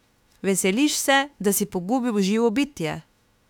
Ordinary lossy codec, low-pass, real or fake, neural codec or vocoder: none; 19.8 kHz; fake; autoencoder, 48 kHz, 32 numbers a frame, DAC-VAE, trained on Japanese speech